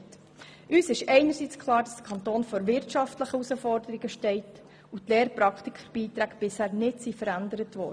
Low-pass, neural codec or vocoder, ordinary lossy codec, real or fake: none; none; none; real